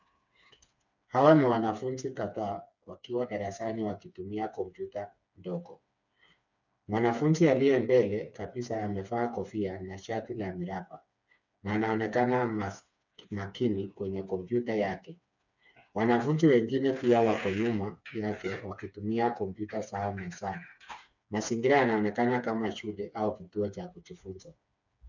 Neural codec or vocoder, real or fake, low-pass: codec, 16 kHz, 4 kbps, FreqCodec, smaller model; fake; 7.2 kHz